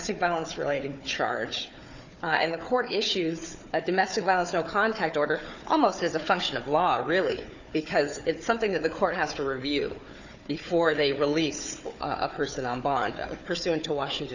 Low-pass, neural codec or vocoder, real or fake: 7.2 kHz; codec, 16 kHz, 4 kbps, FunCodec, trained on Chinese and English, 50 frames a second; fake